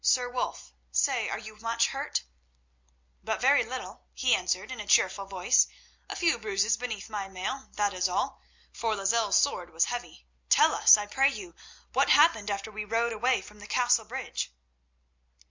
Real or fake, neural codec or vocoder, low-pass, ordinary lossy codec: real; none; 7.2 kHz; MP3, 64 kbps